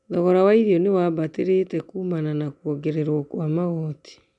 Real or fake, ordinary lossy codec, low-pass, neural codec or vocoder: real; none; 10.8 kHz; none